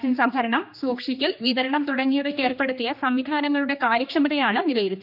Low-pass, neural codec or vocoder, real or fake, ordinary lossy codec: 5.4 kHz; codec, 16 kHz, 2 kbps, X-Codec, HuBERT features, trained on general audio; fake; none